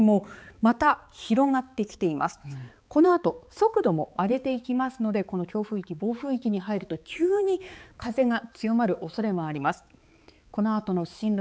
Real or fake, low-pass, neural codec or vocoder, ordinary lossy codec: fake; none; codec, 16 kHz, 4 kbps, X-Codec, HuBERT features, trained on balanced general audio; none